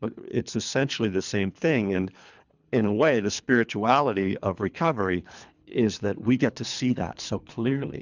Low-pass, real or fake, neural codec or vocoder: 7.2 kHz; fake; codec, 24 kHz, 3 kbps, HILCodec